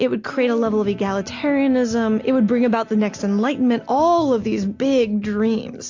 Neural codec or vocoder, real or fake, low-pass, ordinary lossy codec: none; real; 7.2 kHz; AAC, 48 kbps